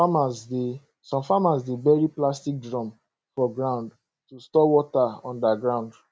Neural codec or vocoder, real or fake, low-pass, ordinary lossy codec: none; real; none; none